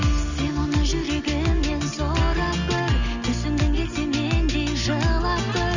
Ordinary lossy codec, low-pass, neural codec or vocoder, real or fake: none; 7.2 kHz; none; real